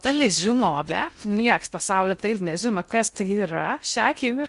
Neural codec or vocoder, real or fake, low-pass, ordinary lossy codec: codec, 16 kHz in and 24 kHz out, 0.6 kbps, FocalCodec, streaming, 4096 codes; fake; 10.8 kHz; Opus, 64 kbps